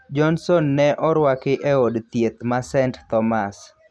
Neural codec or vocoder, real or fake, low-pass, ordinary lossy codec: none; real; none; none